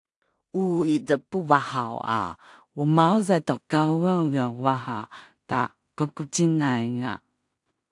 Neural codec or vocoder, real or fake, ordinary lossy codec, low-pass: codec, 16 kHz in and 24 kHz out, 0.4 kbps, LongCat-Audio-Codec, two codebook decoder; fake; AAC, 64 kbps; 10.8 kHz